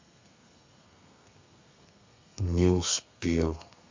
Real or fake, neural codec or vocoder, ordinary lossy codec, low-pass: fake; codec, 32 kHz, 1.9 kbps, SNAC; MP3, 64 kbps; 7.2 kHz